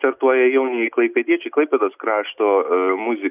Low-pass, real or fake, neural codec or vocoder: 3.6 kHz; real; none